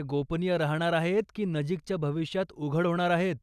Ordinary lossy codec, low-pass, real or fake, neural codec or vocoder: none; 14.4 kHz; real; none